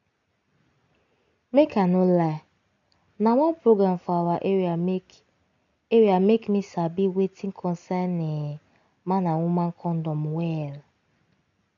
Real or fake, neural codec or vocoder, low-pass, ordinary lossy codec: real; none; 7.2 kHz; none